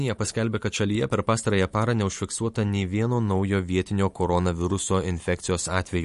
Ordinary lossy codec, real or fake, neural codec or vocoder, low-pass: MP3, 48 kbps; fake; vocoder, 44.1 kHz, 128 mel bands every 512 samples, BigVGAN v2; 14.4 kHz